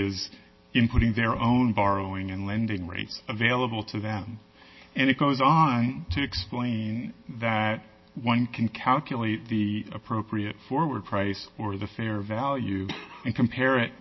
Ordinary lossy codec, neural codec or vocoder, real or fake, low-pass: MP3, 24 kbps; none; real; 7.2 kHz